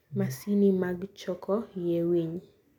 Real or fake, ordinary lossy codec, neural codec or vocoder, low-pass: real; none; none; 19.8 kHz